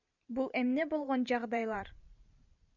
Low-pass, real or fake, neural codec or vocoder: 7.2 kHz; fake; vocoder, 44.1 kHz, 128 mel bands every 512 samples, BigVGAN v2